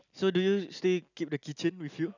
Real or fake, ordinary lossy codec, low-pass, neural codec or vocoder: real; none; 7.2 kHz; none